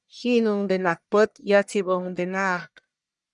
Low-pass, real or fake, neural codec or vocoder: 10.8 kHz; fake; codec, 44.1 kHz, 1.7 kbps, Pupu-Codec